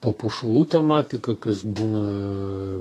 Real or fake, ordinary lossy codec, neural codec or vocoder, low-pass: fake; AAC, 48 kbps; codec, 32 kHz, 1.9 kbps, SNAC; 14.4 kHz